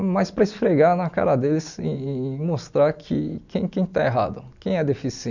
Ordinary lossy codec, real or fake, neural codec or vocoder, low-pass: none; real; none; 7.2 kHz